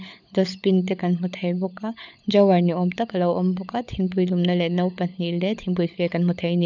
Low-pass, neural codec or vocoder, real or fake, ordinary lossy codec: 7.2 kHz; codec, 16 kHz, 16 kbps, FunCodec, trained on LibriTTS, 50 frames a second; fake; none